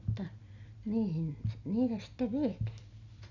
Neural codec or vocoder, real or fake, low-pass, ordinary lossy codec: none; real; 7.2 kHz; none